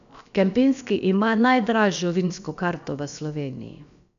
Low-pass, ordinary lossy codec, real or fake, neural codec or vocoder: 7.2 kHz; none; fake; codec, 16 kHz, about 1 kbps, DyCAST, with the encoder's durations